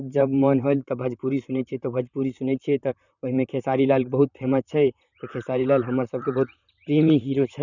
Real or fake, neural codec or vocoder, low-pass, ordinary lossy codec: fake; vocoder, 44.1 kHz, 128 mel bands, Pupu-Vocoder; 7.2 kHz; none